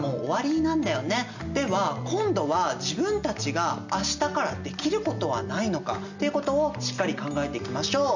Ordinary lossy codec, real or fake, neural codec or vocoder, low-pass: none; real; none; 7.2 kHz